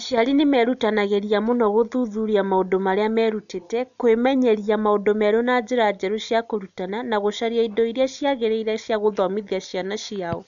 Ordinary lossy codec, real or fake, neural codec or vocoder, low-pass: none; real; none; 7.2 kHz